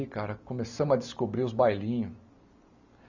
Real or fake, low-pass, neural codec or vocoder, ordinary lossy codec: real; 7.2 kHz; none; none